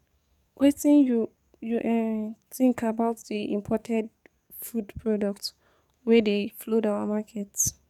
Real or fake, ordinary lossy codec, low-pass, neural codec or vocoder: fake; none; 19.8 kHz; codec, 44.1 kHz, 7.8 kbps, DAC